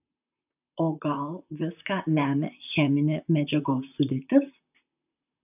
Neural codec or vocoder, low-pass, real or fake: vocoder, 44.1 kHz, 128 mel bands, Pupu-Vocoder; 3.6 kHz; fake